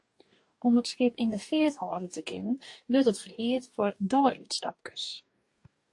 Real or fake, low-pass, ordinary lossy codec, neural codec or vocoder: fake; 10.8 kHz; AAC, 48 kbps; codec, 44.1 kHz, 2.6 kbps, DAC